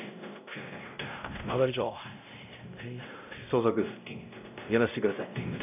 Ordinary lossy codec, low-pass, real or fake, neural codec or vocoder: none; 3.6 kHz; fake; codec, 16 kHz, 0.5 kbps, X-Codec, WavLM features, trained on Multilingual LibriSpeech